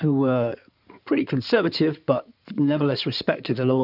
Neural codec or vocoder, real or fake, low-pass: codec, 16 kHz in and 24 kHz out, 2.2 kbps, FireRedTTS-2 codec; fake; 5.4 kHz